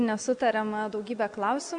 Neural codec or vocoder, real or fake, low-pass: none; real; 9.9 kHz